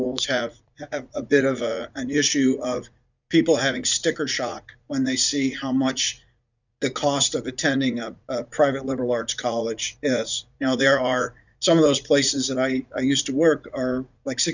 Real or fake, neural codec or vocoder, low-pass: fake; vocoder, 44.1 kHz, 80 mel bands, Vocos; 7.2 kHz